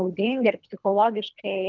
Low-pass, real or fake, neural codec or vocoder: 7.2 kHz; fake; codec, 24 kHz, 3 kbps, HILCodec